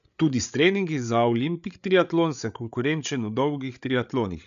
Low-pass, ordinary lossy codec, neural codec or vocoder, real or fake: 7.2 kHz; none; codec, 16 kHz, 8 kbps, FreqCodec, larger model; fake